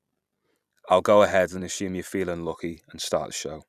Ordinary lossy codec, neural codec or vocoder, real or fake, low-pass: none; vocoder, 44.1 kHz, 128 mel bands every 256 samples, BigVGAN v2; fake; 14.4 kHz